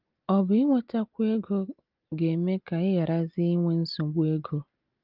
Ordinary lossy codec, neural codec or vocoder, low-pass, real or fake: Opus, 32 kbps; none; 5.4 kHz; real